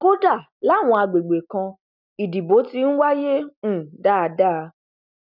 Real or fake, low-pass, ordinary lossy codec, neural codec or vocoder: real; 5.4 kHz; none; none